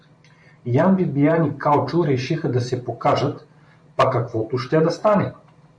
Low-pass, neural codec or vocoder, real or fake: 9.9 kHz; vocoder, 44.1 kHz, 128 mel bands every 512 samples, BigVGAN v2; fake